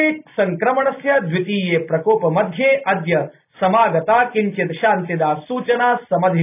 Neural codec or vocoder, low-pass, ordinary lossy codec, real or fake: none; 3.6 kHz; none; real